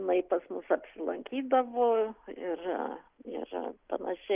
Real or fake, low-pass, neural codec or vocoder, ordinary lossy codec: real; 3.6 kHz; none; Opus, 32 kbps